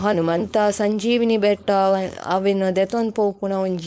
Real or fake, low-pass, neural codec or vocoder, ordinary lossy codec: fake; none; codec, 16 kHz, 4.8 kbps, FACodec; none